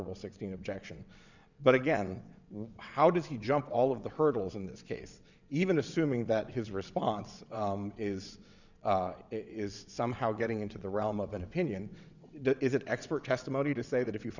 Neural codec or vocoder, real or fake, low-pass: vocoder, 22.05 kHz, 80 mel bands, WaveNeXt; fake; 7.2 kHz